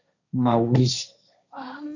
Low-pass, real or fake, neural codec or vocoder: 7.2 kHz; fake; codec, 16 kHz, 1.1 kbps, Voila-Tokenizer